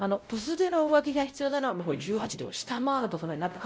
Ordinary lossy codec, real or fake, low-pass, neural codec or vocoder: none; fake; none; codec, 16 kHz, 0.5 kbps, X-Codec, WavLM features, trained on Multilingual LibriSpeech